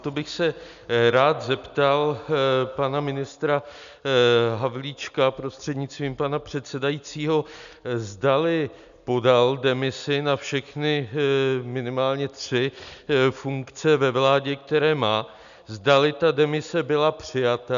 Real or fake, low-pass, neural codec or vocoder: real; 7.2 kHz; none